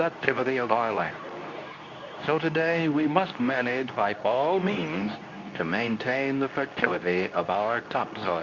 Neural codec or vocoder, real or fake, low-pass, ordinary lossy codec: codec, 24 kHz, 0.9 kbps, WavTokenizer, medium speech release version 2; fake; 7.2 kHz; Opus, 64 kbps